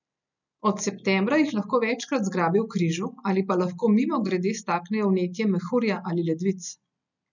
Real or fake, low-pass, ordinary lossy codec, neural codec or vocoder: real; 7.2 kHz; none; none